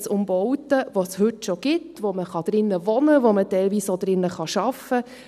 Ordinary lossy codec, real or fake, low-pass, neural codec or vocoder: none; real; 14.4 kHz; none